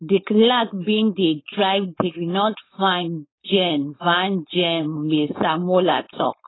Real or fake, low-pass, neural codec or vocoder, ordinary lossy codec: fake; 7.2 kHz; codec, 16 kHz, 4.8 kbps, FACodec; AAC, 16 kbps